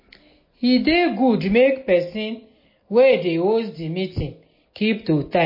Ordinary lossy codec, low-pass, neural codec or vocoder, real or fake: MP3, 24 kbps; 5.4 kHz; none; real